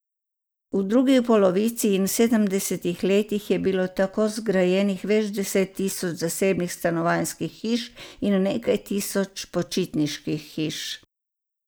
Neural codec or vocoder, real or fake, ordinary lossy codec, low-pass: none; real; none; none